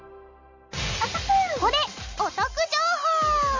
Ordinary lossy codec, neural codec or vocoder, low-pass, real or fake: MP3, 64 kbps; none; 7.2 kHz; real